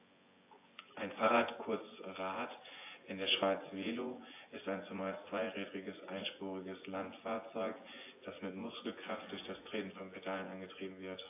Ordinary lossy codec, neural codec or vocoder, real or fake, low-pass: AAC, 24 kbps; vocoder, 24 kHz, 100 mel bands, Vocos; fake; 3.6 kHz